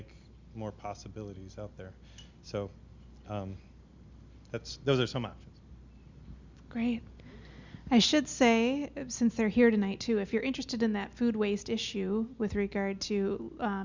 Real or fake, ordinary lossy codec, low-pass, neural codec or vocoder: real; Opus, 64 kbps; 7.2 kHz; none